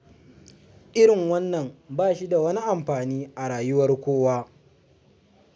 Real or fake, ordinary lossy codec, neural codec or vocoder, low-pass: real; none; none; none